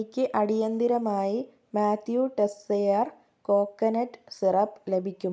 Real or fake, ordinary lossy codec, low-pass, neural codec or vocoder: real; none; none; none